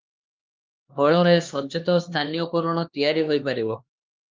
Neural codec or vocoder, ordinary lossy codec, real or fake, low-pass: codec, 16 kHz, 2 kbps, X-Codec, HuBERT features, trained on LibriSpeech; Opus, 32 kbps; fake; 7.2 kHz